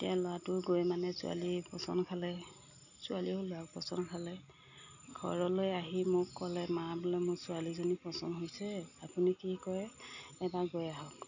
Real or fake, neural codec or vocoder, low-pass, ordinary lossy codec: real; none; 7.2 kHz; none